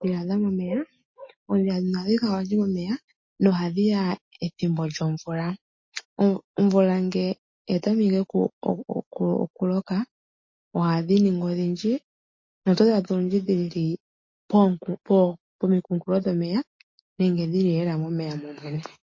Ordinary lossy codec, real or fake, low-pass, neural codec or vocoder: MP3, 32 kbps; real; 7.2 kHz; none